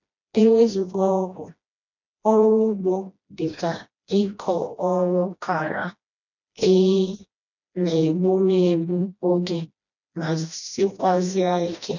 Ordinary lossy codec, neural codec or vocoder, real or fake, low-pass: none; codec, 16 kHz, 1 kbps, FreqCodec, smaller model; fake; 7.2 kHz